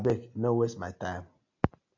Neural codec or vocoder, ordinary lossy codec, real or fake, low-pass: none; AAC, 48 kbps; real; 7.2 kHz